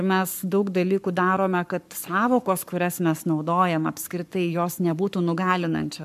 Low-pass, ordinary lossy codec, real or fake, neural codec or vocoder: 14.4 kHz; MP3, 96 kbps; fake; codec, 44.1 kHz, 7.8 kbps, Pupu-Codec